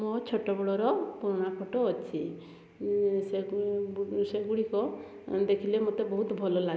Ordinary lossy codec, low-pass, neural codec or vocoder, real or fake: none; none; none; real